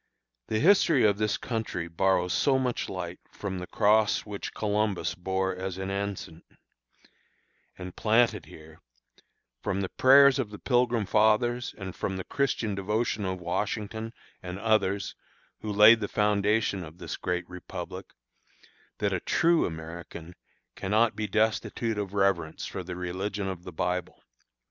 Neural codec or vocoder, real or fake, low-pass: none; real; 7.2 kHz